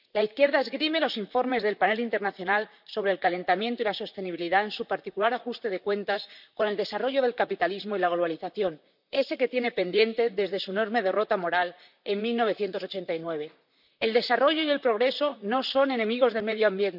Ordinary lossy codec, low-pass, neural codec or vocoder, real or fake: none; 5.4 kHz; vocoder, 44.1 kHz, 128 mel bands, Pupu-Vocoder; fake